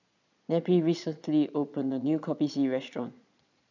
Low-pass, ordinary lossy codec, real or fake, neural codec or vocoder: 7.2 kHz; none; fake; vocoder, 22.05 kHz, 80 mel bands, Vocos